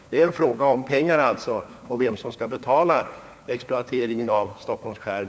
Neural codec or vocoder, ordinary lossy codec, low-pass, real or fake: codec, 16 kHz, 4 kbps, FunCodec, trained on LibriTTS, 50 frames a second; none; none; fake